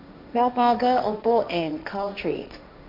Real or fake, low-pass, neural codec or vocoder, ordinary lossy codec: fake; 5.4 kHz; codec, 16 kHz, 1.1 kbps, Voila-Tokenizer; MP3, 48 kbps